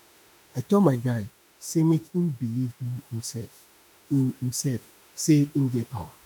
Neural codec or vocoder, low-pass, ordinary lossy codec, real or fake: autoencoder, 48 kHz, 32 numbers a frame, DAC-VAE, trained on Japanese speech; none; none; fake